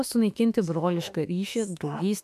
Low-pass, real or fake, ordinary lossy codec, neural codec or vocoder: 14.4 kHz; fake; AAC, 96 kbps; autoencoder, 48 kHz, 32 numbers a frame, DAC-VAE, trained on Japanese speech